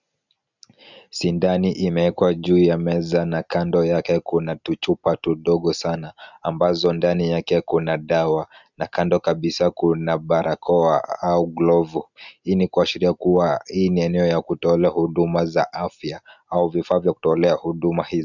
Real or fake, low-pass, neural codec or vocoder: real; 7.2 kHz; none